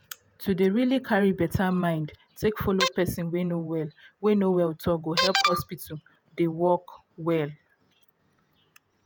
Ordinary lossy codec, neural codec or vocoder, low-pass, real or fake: none; vocoder, 48 kHz, 128 mel bands, Vocos; none; fake